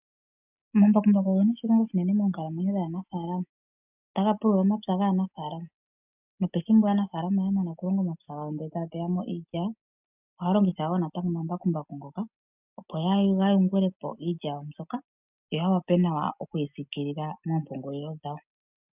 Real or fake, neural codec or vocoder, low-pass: real; none; 3.6 kHz